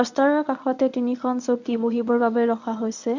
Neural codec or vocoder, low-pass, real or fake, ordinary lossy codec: codec, 24 kHz, 0.9 kbps, WavTokenizer, medium speech release version 1; 7.2 kHz; fake; none